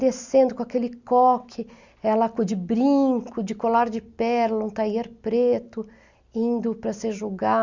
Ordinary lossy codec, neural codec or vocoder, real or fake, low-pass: Opus, 64 kbps; none; real; 7.2 kHz